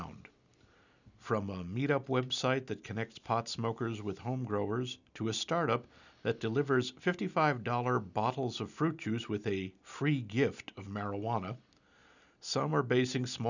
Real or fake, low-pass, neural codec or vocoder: real; 7.2 kHz; none